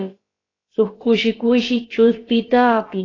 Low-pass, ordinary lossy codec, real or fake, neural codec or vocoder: 7.2 kHz; AAC, 32 kbps; fake; codec, 16 kHz, about 1 kbps, DyCAST, with the encoder's durations